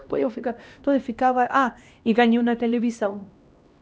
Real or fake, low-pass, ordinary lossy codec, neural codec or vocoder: fake; none; none; codec, 16 kHz, 1 kbps, X-Codec, HuBERT features, trained on LibriSpeech